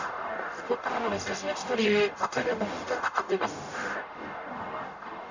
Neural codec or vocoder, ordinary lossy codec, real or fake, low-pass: codec, 44.1 kHz, 0.9 kbps, DAC; none; fake; 7.2 kHz